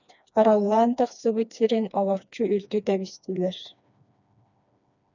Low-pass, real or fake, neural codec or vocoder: 7.2 kHz; fake; codec, 16 kHz, 2 kbps, FreqCodec, smaller model